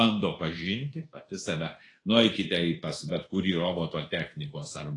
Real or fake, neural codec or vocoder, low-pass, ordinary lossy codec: fake; codec, 24 kHz, 1.2 kbps, DualCodec; 10.8 kHz; AAC, 32 kbps